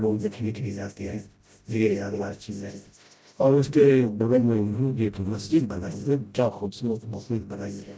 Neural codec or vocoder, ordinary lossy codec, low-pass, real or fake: codec, 16 kHz, 0.5 kbps, FreqCodec, smaller model; none; none; fake